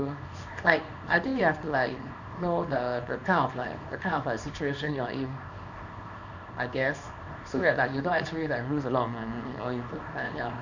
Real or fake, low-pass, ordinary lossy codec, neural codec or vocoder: fake; 7.2 kHz; none; codec, 24 kHz, 0.9 kbps, WavTokenizer, small release